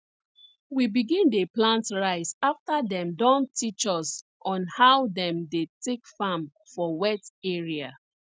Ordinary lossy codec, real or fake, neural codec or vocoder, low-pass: none; real; none; none